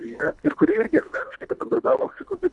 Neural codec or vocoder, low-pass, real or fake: codec, 24 kHz, 1.5 kbps, HILCodec; 10.8 kHz; fake